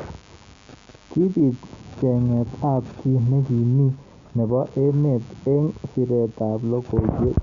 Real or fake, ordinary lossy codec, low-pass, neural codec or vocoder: real; Opus, 64 kbps; 7.2 kHz; none